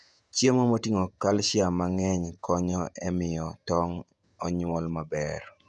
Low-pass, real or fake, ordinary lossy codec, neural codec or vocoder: 10.8 kHz; fake; none; vocoder, 48 kHz, 128 mel bands, Vocos